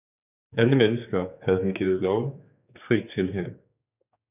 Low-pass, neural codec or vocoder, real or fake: 3.6 kHz; codec, 16 kHz, 4 kbps, X-Codec, HuBERT features, trained on general audio; fake